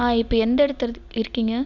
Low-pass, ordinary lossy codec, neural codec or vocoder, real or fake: 7.2 kHz; none; none; real